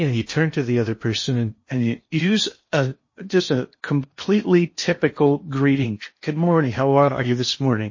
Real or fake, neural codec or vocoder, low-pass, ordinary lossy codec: fake; codec, 16 kHz in and 24 kHz out, 0.8 kbps, FocalCodec, streaming, 65536 codes; 7.2 kHz; MP3, 32 kbps